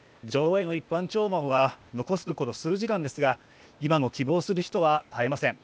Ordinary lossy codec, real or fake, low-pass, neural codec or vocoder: none; fake; none; codec, 16 kHz, 0.8 kbps, ZipCodec